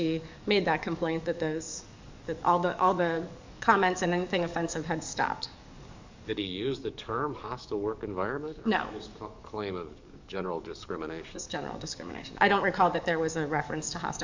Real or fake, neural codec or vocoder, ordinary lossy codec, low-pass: fake; codec, 44.1 kHz, 7.8 kbps, DAC; MP3, 64 kbps; 7.2 kHz